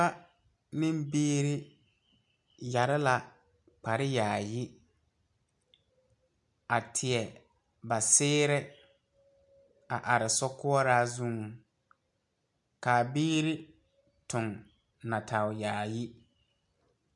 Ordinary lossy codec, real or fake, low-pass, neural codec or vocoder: MP3, 64 kbps; real; 10.8 kHz; none